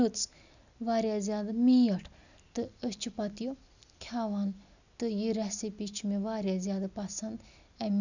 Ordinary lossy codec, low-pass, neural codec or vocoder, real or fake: none; 7.2 kHz; none; real